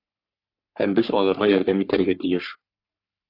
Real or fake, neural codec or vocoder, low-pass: fake; codec, 44.1 kHz, 3.4 kbps, Pupu-Codec; 5.4 kHz